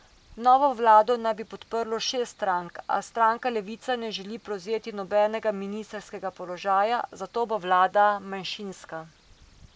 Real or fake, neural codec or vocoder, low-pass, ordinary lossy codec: real; none; none; none